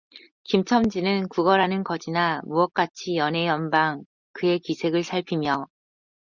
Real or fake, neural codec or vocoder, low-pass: real; none; 7.2 kHz